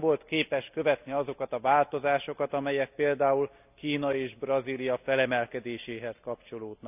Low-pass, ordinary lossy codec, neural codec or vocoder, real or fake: 3.6 kHz; none; none; real